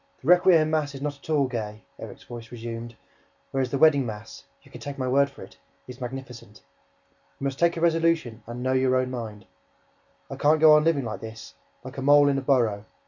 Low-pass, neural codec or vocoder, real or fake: 7.2 kHz; none; real